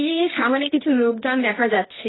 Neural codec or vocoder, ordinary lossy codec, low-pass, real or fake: codec, 24 kHz, 0.9 kbps, WavTokenizer, medium music audio release; AAC, 16 kbps; 7.2 kHz; fake